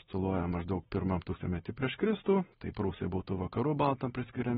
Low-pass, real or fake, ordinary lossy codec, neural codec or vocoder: 19.8 kHz; real; AAC, 16 kbps; none